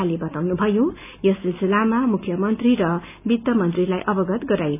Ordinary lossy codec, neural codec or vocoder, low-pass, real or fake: none; none; 3.6 kHz; real